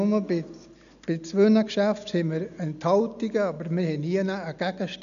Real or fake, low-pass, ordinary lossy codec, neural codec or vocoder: real; 7.2 kHz; none; none